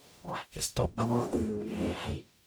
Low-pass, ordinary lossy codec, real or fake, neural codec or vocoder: none; none; fake; codec, 44.1 kHz, 0.9 kbps, DAC